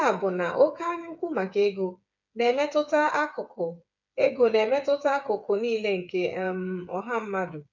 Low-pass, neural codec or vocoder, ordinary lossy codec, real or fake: 7.2 kHz; codec, 16 kHz, 8 kbps, FreqCodec, smaller model; none; fake